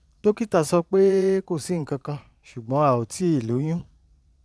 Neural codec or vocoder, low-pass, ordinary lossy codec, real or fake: vocoder, 22.05 kHz, 80 mel bands, Vocos; none; none; fake